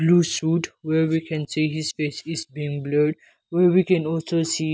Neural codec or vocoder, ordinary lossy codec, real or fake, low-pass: none; none; real; none